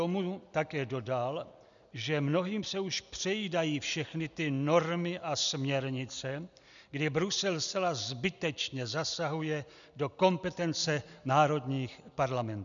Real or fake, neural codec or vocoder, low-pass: real; none; 7.2 kHz